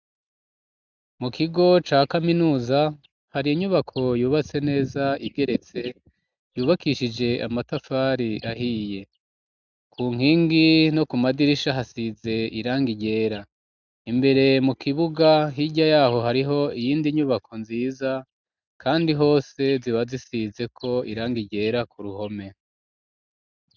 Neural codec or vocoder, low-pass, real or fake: none; 7.2 kHz; real